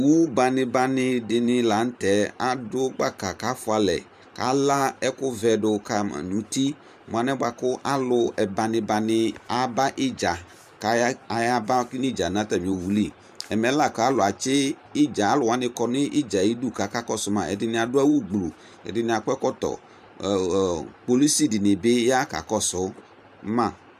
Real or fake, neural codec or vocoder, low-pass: fake; vocoder, 44.1 kHz, 128 mel bands every 512 samples, BigVGAN v2; 14.4 kHz